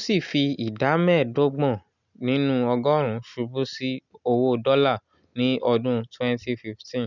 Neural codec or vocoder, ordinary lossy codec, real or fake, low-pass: none; none; real; 7.2 kHz